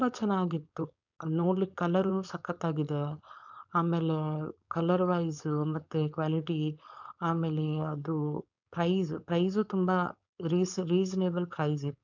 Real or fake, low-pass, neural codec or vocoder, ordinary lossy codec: fake; 7.2 kHz; codec, 16 kHz, 4.8 kbps, FACodec; none